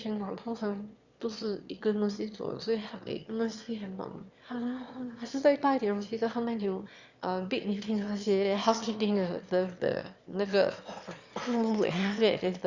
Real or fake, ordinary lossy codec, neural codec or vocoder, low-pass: fake; Opus, 64 kbps; autoencoder, 22.05 kHz, a latent of 192 numbers a frame, VITS, trained on one speaker; 7.2 kHz